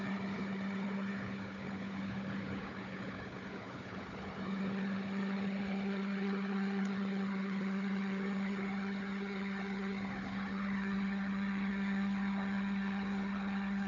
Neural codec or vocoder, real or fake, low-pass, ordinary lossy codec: codec, 16 kHz, 4 kbps, FunCodec, trained on Chinese and English, 50 frames a second; fake; 7.2 kHz; none